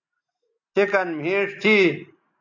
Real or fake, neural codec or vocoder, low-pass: real; none; 7.2 kHz